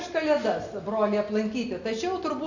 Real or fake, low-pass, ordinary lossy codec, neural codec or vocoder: real; 7.2 kHz; Opus, 64 kbps; none